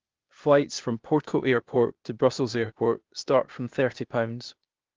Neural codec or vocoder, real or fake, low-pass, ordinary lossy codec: codec, 16 kHz, 0.8 kbps, ZipCodec; fake; 7.2 kHz; Opus, 24 kbps